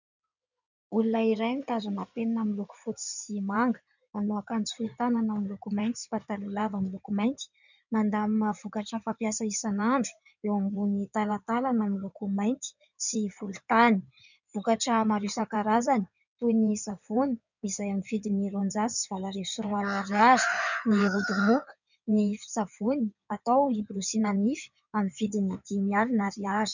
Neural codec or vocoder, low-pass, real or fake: codec, 16 kHz in and 24 kHz out, 2.2 kbps, FireRedTTS-2 codec; 7.2 kHz; fake